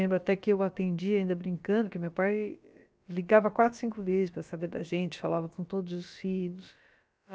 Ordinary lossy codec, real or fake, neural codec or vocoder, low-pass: none; fake; codec, 16 kHz, about 1 kbps, DyCAST, with the encoder's durations; none